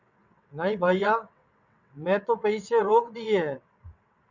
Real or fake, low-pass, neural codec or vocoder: fake; 7.2 kHz; vocoder, 22.05 kHz, 80 mel bands, WaveNeXt